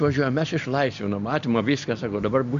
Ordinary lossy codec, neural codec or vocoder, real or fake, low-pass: MP3, 64 kbps; none; real; 7.2 kHz